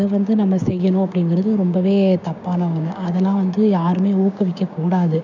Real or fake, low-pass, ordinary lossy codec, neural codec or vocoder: fake; 7.2 kHz; none; codec, 16 kHz, 6 kbps, DAC